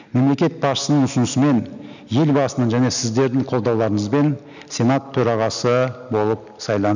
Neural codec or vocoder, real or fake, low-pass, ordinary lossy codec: none; real; 7.2 kHz; none